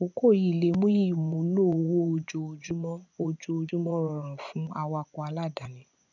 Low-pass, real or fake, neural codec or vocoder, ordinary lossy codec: 7.2 kHz; fake; vocoder, 24 kHz, 100 mel bands, Vocos; none